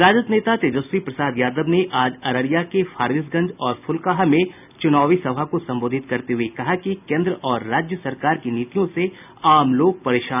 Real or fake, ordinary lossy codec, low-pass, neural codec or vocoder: real; none; 3.6 kHz; none